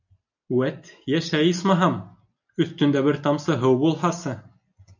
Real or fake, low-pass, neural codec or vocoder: real; 7.2 kHz; none